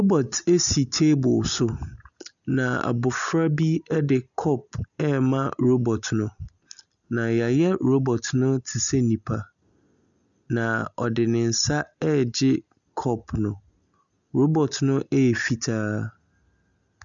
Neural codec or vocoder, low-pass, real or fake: none; 7.2 kHz; real